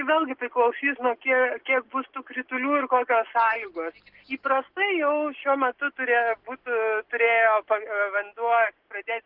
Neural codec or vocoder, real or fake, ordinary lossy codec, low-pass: none; real; Opus, 16 kbps; 5.4 kHz